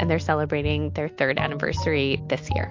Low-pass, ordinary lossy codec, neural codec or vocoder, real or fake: 7.2 kHz; MP3, 48 kbps; none; real